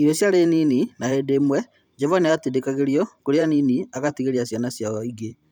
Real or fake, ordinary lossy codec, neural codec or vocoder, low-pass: fake; none; vocoder, 44.1 kHz, 128 mel bands every 512 samples, BigVGAN v2; 19.8 kHz